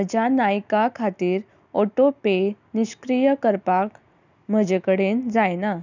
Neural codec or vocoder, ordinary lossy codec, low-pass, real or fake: none; none; 7.2 kHz; real